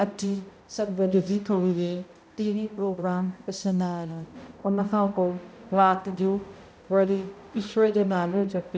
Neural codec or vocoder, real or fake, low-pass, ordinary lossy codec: codec, 16 kHz, 0.5 kbps, X-Codec, HuBERT features, trained on balanced general audio; fake; none; none